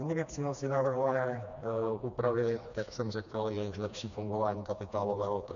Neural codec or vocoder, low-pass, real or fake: codec, 16 kHz, 2 kbps, FreqCodec, smaller model; 7.2 kHz; fake